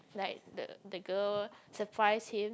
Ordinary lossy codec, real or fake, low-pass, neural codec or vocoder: none; real; none; none